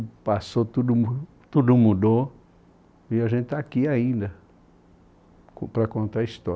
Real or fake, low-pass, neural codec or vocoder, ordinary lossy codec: real; none; none; none